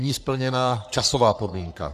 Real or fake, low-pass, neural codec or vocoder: fake; 14.4 kHz; codec, 44.1 kHz, 3.4 kbps, Pupu-Codec